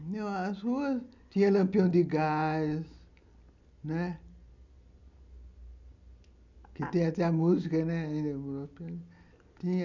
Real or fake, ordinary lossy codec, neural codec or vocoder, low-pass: real; none; none; 7.2 kHz